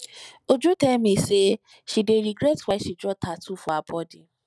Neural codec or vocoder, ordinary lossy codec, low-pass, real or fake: none; none; none; real